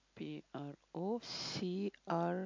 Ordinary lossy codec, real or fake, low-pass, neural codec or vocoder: MP3, 48 kbps; real; 7.2 kHz; none